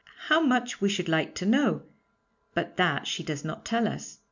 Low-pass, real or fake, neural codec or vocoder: 7.2 kHz; real; none